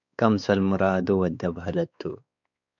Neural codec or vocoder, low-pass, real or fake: codec, 16 kHz, 4 kbps, X-Codec, WavLM features, trained on Multilingual LibriSpeech; 7.2 kHz; fake